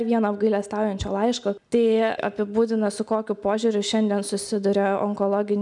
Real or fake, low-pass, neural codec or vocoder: real; 10.8 kHz; none